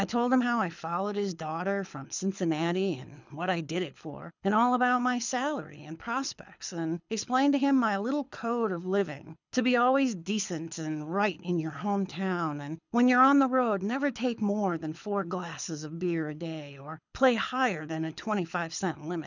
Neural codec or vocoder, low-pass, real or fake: codec, 24 kHz, 6 kbps, HILCodec; 7.2 kHz; fake